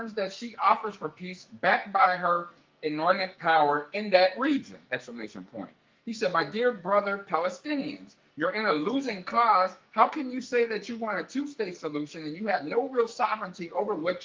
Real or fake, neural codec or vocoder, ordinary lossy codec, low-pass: fake; codec, 44.1 kHz, 2.6 kbps, SNAC; Opus, 32 kbps; 7.2 kHz